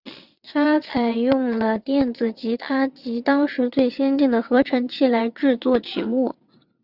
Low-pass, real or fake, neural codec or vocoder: 5.4 kHz; fake; vocoder, 22.05 kHz, 80 mel bands, WaveNeXt